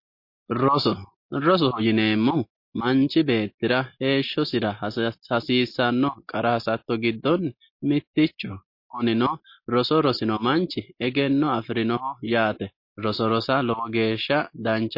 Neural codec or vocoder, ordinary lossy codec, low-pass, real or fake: none; MP3, 32 kbps; 5.4 kHz; real